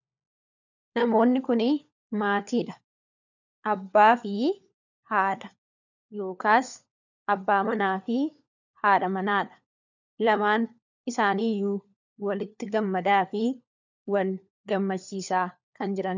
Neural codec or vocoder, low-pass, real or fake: codec, 16 kHz, 4 kbps, FunCodec, trained on LibriTTS, 50 frames a second; 7.2 kHz; fake